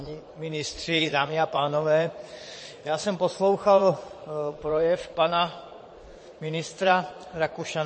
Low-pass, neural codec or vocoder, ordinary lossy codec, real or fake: 9.9 kHz; vocoder, 22.05 kHz, 80 mel bands, Vocos; MP3, 32 kbps; fake